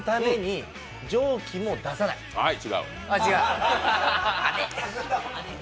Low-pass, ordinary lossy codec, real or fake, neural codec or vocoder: none; none; real; none